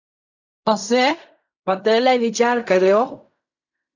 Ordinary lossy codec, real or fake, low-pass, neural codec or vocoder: AAC, 48 kbps; fake; 7.2 kHz; codec, 16 kHz in and 24 kHz out, 0.4 kbps, LongCat-Audio-Codec, fine tuned four codebook decoder